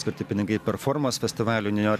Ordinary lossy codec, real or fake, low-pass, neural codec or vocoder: AAC, 96 kbps; real; 14.4 kHz; none